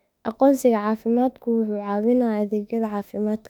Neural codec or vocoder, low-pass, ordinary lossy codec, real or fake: autoencoder, 48 kHz, 32 numbers a frame, DAC-VAE, trained on Japanese speech; 19.8 kHz; none; fake